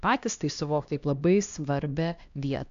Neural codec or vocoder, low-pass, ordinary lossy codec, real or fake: codec, 16 kHz, 1 kbps, X-Codec, HuBERT features, trained on LibriSpeech; 7.2 kHz; AAC, 64 kbps; fake